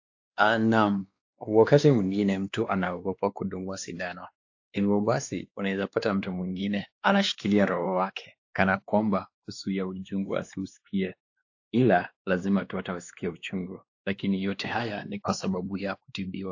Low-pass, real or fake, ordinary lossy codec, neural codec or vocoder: 7.2 kHz; fake; AAC, 48 kbps; codec, 16 kHz, 2 kbps, X-Codec, WavLM features, trained on Multilingual LibriSpeech